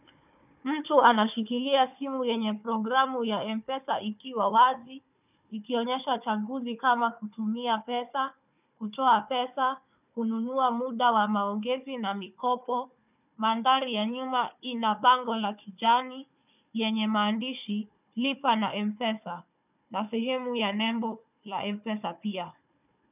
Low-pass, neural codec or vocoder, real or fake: 3.6 kHz; codec, 16 kHz, 4 kbps, FunCodec, trained on Chinese and English, 50 frames a second; fake